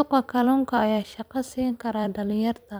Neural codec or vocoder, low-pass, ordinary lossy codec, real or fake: vocoder, 44.1 kHz, 128 mel bands every 256 samples, BigVGAN v2; none; none; fake